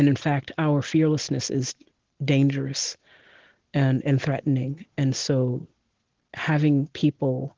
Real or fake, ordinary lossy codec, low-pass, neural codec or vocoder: real; Opus, 16 kbps; 7.2 kHz; none